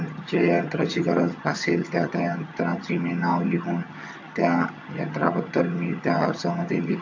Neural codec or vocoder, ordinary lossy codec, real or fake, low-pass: vocoder, 22.05 kHz, 80 mel bands, HiFi-GAN; MP3, 48 kbps; fake; 7.2 kHz